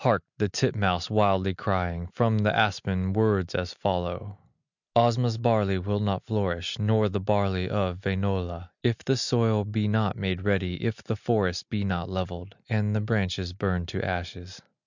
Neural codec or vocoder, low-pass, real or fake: none; 7.2 kHz; real